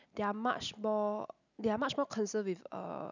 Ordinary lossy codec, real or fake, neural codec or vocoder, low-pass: none; real; none; 7.2 kHz